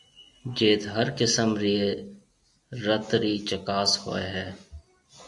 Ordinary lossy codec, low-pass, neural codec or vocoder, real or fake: AAC, 64 kbps; 10.8 kHz; none; real